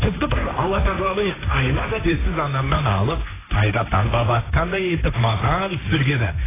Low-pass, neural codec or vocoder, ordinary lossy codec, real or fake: 3.6 kHz; codec, 16 kHz, 1.1 kbps, Voila-Tokenizer; AAC, 16 kbps; fake